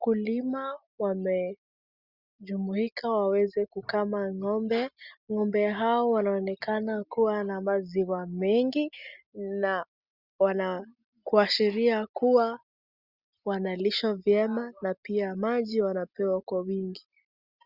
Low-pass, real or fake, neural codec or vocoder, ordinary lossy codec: 5.4 kHz; real; none; Opus, 64 kbps